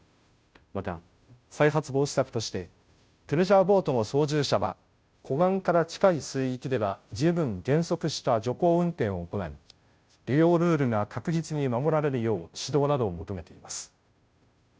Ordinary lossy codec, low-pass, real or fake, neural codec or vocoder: none; none; fake; codec, 16 kHz, 0.5 kbps, FunCodec, trained on Chinese and English, 25 frames a second